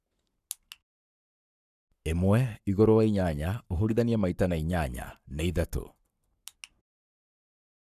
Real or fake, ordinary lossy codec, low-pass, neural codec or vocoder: fake; none; 14.4 kHz; codec, 44.1 kHz, 7.8 kbps, Pupu-Codec